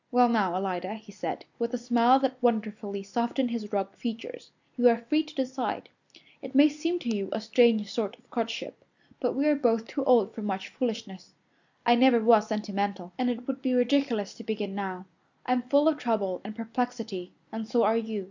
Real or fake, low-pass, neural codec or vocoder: real; 7.2 kHz; none